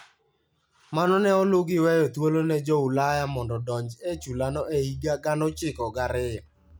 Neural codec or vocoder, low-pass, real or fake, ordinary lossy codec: none; none; real; none